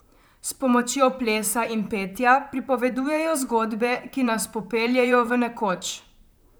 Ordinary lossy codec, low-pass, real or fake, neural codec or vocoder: none; none; fake; vocoder, 44.1 kHz, 128 mel bands, Pupu-Vocoder